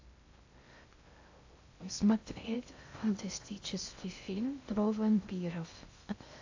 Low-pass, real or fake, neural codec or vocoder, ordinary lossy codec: 7.2 kHz; fake; codec, 16 kHz in and 24 kHz out, 0.6 kbps, FocalCodec, streaming, 2048 codes; AAC, 48 kbps